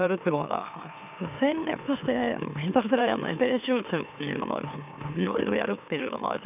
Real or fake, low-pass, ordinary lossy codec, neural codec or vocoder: fake; 3.6 kHz; none; autoencoder, 44.1 kHz, a latent of 192 numbers a frame, MeloTTS